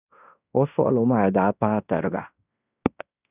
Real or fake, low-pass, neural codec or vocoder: fake; 3.6 kHz; codec, 16 kHz in and 24 kHz out, 0.9 kbps, LongCat-Audio-Codec, fine tuned four codebook decoder